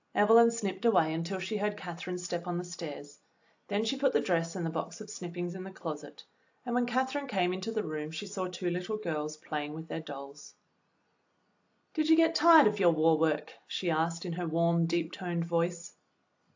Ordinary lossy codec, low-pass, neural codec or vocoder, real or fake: AAC, 48 kbps; 7.2 kHz; none; real